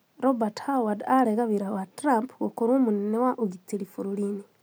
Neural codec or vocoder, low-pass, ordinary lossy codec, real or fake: none; none; none; real